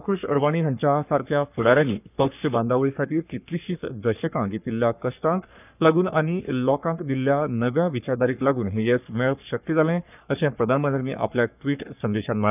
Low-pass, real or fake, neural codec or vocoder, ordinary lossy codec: 3.6 kHz; fake; codec, 44.1 kHz, 3.4 kbps, Pupu-Codec; none